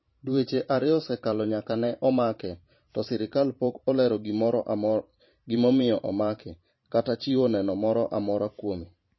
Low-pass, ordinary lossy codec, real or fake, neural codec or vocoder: 7.2 kHz; MP3, 24 kbps; fake; vocoder, 44.1 kHz, 128 mel bands every 512 samples, BigVGAN v2